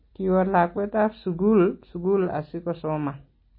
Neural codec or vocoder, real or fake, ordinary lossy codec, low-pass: none; real; MP3, 24 kbps; 5.4 kHz